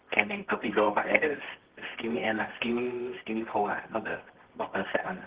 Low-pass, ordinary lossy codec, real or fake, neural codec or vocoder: 3.6 kHz; Opus, 16 kbps; fake; codec, 24 kHz, 0.9 kbps, WavTokenizer, medium music audio release